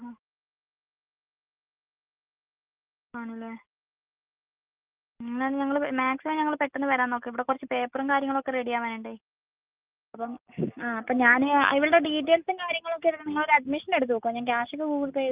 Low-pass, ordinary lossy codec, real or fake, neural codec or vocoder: 3.6 kHz; Opus, 24 kbps; real; none